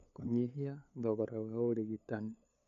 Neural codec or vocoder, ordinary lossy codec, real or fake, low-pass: codec, 16 kHz, 8 kbps, FunCodec, trained on LibriTTS, 25 frames a second; none; fake; 7.2 kHz